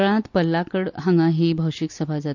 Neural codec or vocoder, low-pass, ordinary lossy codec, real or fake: none; 7.2 kHz; none; real